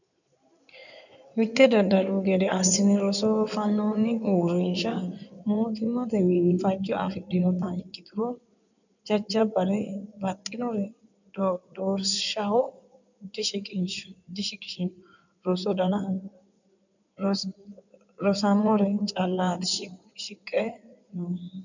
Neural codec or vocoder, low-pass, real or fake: codec, 16 kHz in and 24 kHz out, 2.2 kbps, FireRedTTS-2 codec; 7.2 kHz; fake